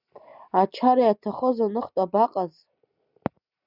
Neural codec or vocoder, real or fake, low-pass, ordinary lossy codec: none; real; 5.4 kHz; Opus, 64 kbps